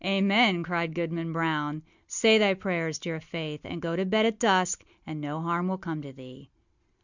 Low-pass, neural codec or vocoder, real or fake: 7.2 kHz; none; real